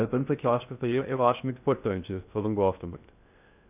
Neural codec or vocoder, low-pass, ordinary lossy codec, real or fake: codec, 16 kHz in and 24 kHz out, 0.6 kbps, FocalCodec, streaming, 2048 codes; 3.6 kHz; none; fake